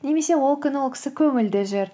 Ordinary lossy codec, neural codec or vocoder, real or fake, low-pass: none; none; real; none